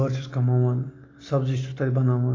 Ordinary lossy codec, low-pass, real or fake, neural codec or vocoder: AAC, 32 kbps; 7.2 kHz; real; none